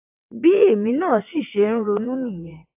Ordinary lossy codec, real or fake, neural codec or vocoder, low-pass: AAC, 32 kbps; fake; vocoder, 22.05 kHz, 80 mel bands, WaveNeXt; 3.6 kHz